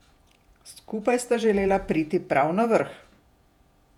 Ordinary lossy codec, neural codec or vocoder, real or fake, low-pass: none; vocoder, 48 kHz, 128 mel bands, Vocos; fake; 19.8 kHz